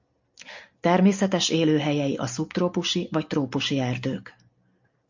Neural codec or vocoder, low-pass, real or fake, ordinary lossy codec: none; 7.2 kHz; real; MP3, 48 kbps